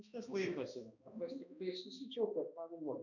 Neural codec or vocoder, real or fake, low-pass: codec, 16 kHz, 1 kbps, X-Codec, HuBERT features, trained on balanced general audio; fake; 7.2 kHz